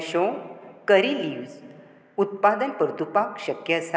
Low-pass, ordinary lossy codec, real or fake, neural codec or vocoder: none; none; real; none